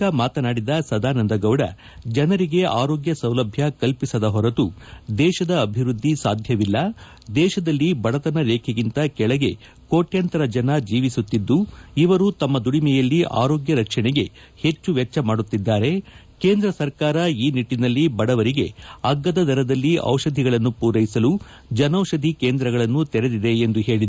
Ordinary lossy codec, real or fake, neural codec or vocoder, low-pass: none; real; none; none